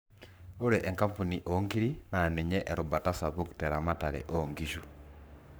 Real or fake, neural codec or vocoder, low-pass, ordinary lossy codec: fake; codec, 44.1 kHz, 7.8 kbps, Pupu-Codec; none; none